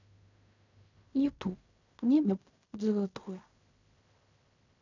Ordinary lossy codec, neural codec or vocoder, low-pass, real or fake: none; codec, 16 kHz in and 24 kHz out, 0.4 kbps, LongCat-Audio-Codec, fine tuned four codebook decoder; 7.2 kHz; fake